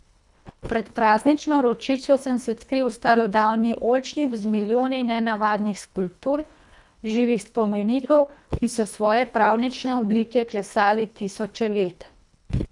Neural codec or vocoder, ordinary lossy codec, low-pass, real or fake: codec, 24 kHz, 1.5 kbps, HILCodec; none; 10.8 kHz; fake